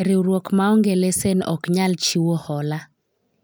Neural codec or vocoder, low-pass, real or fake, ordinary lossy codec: none; none; real; none